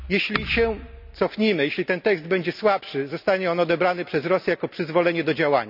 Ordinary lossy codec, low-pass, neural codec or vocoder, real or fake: none; 5.4 kHz; none; real